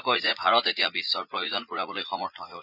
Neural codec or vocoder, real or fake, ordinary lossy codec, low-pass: vocoder, 44.1 kHz, 80 mel bands, Vocos; fake; none; 5.4 kHz